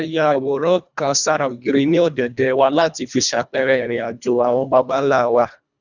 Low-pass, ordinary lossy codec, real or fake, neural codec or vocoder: 7.2 kHz; none; fake; codec, 24 kHz, 1.5 kbps, HILCodec